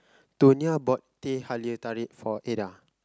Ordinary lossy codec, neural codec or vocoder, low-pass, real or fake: none; none; none; real